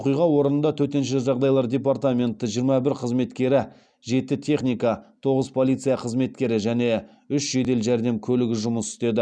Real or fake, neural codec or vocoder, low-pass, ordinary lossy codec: real; none; 9.9 kHz; none